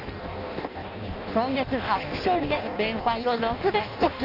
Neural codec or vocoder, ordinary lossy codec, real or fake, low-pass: codec, 16 kHz in and 24 kHz out, 0.6 kbps, FireRedTTS-2 codec; none; fake; 5.4 kHz